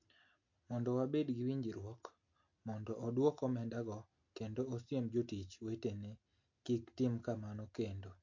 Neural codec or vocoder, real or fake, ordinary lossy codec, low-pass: none; real; MP3, 48 kbps; 7.2 kHz